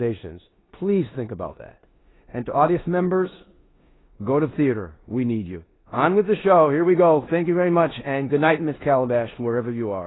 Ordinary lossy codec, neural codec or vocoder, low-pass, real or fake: AAC, 16 kbps; codec, 16 kHz in and 24 kHz out, 0.9 kbps, LongCat-Audio-Codec, four codebook decoder; 7.2 kHz; fake